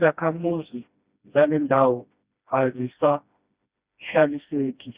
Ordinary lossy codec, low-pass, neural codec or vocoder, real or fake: Opus, 64 kbps; 3.6 kHz; codec, 16 kHz, 1 kbps, FreqCodec, smaller model; fake